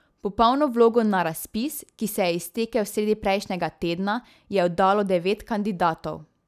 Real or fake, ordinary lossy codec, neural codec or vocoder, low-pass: real; none; none; 14.4 kHz